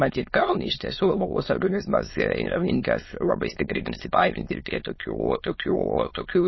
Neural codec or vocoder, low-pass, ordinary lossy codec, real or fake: autoencoder, 22.05 kHz, a latent of 192 numbers a frame, VITS, trained on many speakers; 7.2 kHz; MP3, 24 kbps; fake